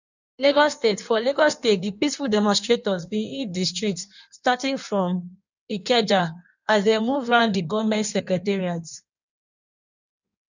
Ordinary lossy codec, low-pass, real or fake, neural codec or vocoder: none; 7.2 kHz; fake; codec, 16 kHz in and 24 kHz out, 1.1 kbps, FireRedTTS-2 codec